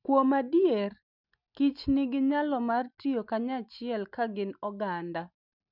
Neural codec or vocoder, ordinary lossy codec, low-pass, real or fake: none; none; 5.4 kHz; real